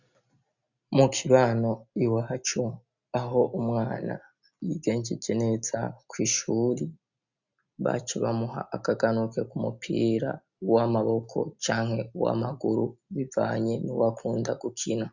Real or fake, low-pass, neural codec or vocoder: real; 7.2 kHz; none